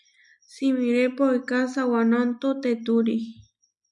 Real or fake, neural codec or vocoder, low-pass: real; none; 10.8 kHz